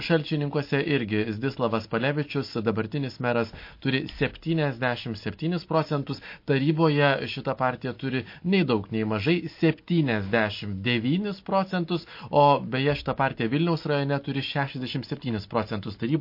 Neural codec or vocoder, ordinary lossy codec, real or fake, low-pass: none; MP3, 32 kbps; real; 5.4 kHz